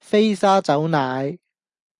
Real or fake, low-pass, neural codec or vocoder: real; 10.8 kHz; none